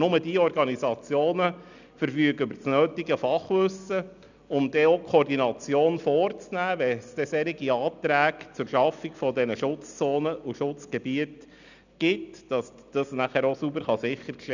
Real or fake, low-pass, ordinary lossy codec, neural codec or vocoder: real; 7.2 kHz; none; none